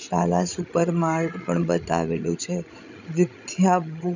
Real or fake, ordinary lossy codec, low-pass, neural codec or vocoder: fake; none; 7.2 kHz; codec, 16 kHz, 16 kbps, FreqCodec, larger model